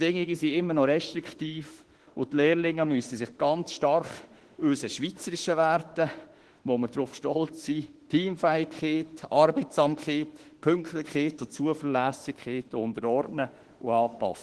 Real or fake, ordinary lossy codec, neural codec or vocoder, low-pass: fake; Opus, 16 kbps; autoencoder, 48 kHz, 32 numbers a frame, DAC-VAE, trained on Japanese speech; 10.8 kHz